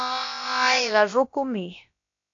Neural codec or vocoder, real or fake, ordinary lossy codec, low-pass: codec, 16 kHz, about 1 kbps, DyCAST, with the encoder's durations; fake; AAC, 48 kbps; 7.2 kHz